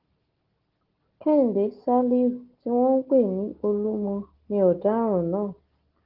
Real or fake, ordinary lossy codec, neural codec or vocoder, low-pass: real; Opus, 16 kbps; none; 5.4 kHz